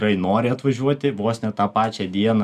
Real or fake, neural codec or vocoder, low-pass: real; none; 14.4 kHz